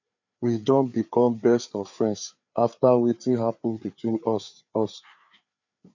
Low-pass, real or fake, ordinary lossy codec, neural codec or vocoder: 7.2 kHz; fake; none; codec, 16 kHz, 4 kbps, FreqCodec, larger model